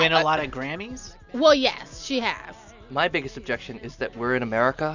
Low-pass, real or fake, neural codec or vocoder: 7.2 kHz; real; none